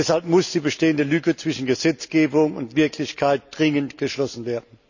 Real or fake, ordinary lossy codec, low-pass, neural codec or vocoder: real; none; 7.2 kHz; none